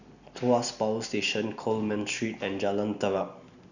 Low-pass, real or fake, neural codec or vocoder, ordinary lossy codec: 7.2 kHz; real; none; none